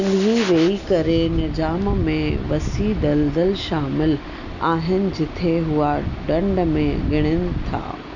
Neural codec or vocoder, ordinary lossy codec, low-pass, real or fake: none; none; 7.2 kHz; real